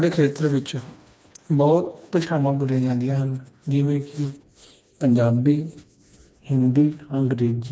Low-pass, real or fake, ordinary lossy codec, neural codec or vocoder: none; fake; none; codec, 16 kHz, 2 kbps, FreqCodec, smaller model